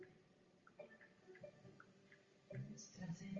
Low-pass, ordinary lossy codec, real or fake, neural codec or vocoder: 7.2 kHz; Opus, 32 kbps; real; none